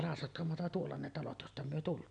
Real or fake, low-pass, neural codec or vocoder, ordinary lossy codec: real; 9.9 kHz; none; none